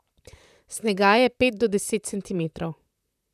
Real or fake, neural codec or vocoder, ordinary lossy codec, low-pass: fake; vocoder, 44.1 kHz, 128 mel bands, Pupu-Vocoder; none; 14.4 kHz